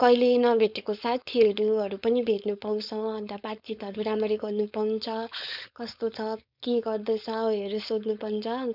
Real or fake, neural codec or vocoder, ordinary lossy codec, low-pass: fake; codec, 16 kHz, 4.8 kbps, FACodec; none; 5.4 kHz